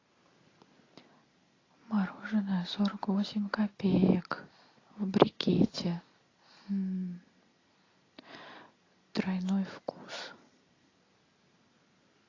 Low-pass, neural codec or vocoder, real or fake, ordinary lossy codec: 7.2 kHz; none; real; AAC, 32 kbps